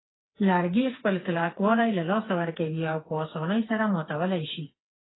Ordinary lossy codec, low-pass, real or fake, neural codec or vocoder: AAC, 16 kbps; 7.2 kHz; fake; codec, 16 kHz, 4 kbps, FreqCodec, smaller model